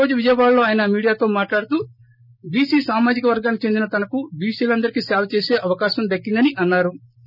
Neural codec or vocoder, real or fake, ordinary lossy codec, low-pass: none; real; none; 5.4 kHz